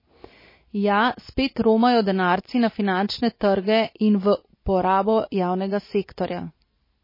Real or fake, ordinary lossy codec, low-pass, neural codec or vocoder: real; MP3, 24 kbps; 5.4 kHz; none